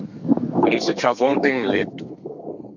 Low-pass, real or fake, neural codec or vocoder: 7.2 kHz; fake; codec, 32 kHz, 1.9 kbps, SNAC